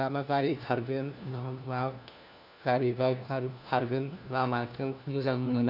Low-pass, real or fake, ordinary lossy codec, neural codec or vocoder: 5.4 kHz; fake; none; codec, 16 kHz, 1 kbps, FunCodec, trained on LibriTTS, 50 frames a second